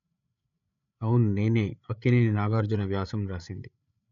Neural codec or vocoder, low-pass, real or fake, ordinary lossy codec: codec, 16 kHz, 8 kbps, FreqCodec, larger model; 7.2 kHz; fake; none